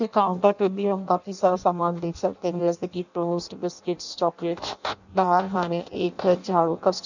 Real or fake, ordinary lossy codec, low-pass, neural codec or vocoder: fake; none; 7.2 kHz; codec, 16 kHz in and 24 kHz out, 0.6 kbps, FireRedTTS-2 codec